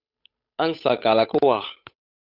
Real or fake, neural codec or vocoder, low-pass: fake; codec, 16 kHz, 8 kbps, FunCodec, trained on Chinese and English, 25 frames a second; 5.4 kHz